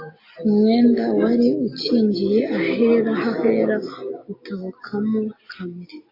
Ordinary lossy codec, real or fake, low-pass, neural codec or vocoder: AAC, 32 kbps; real; 5.4 kHz; none